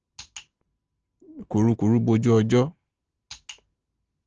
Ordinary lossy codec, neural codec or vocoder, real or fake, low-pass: Opus, 16 kbps; none; real; 7.2 kHz